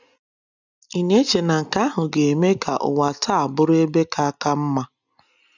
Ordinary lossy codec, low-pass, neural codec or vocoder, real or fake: none; 7.2 kHz; none; real